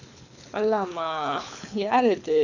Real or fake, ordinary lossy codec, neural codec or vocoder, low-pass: fake; none; codec, 24 kHz, 6 kbps, HILCodec; 7.2 kHz